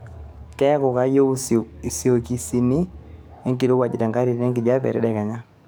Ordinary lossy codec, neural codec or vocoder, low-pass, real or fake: none; codec, 44.1 kHz, 7.8 kbps, DAC; none; fake